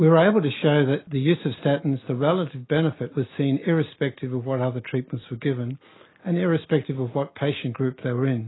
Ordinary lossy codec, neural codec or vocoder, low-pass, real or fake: AAC, 16 kbps; none; 7.2 kHz; real